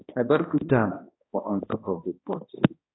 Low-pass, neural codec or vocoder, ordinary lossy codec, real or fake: 7.2 kHz; codec, 16 kHz, 1 kbps, X-Codec, HuBERT features, trained on balanced general audio; AAC, 16 kbps; fake